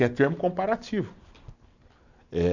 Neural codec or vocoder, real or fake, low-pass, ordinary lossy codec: none; real; 7.2 kHz; none